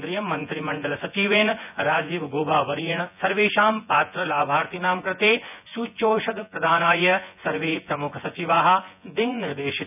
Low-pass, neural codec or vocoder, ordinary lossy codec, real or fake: 3.6 kHz; vocoder, 24 kHz, 100 mel bands, Vocos; none; fake